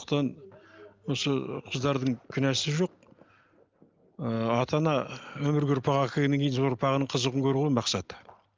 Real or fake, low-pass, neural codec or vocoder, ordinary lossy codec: real; 7.2 kHz; none; Opus, 24 kbps